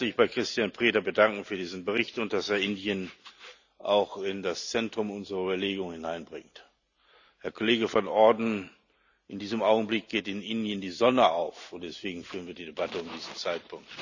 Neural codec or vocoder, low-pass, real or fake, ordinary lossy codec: none; 7.2 kHz; real; none